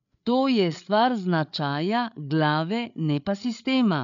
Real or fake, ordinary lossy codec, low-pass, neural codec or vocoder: fake; none; 7.2 kHz; codec, 16 kHz, 8 kbps, FreqCodec, larger model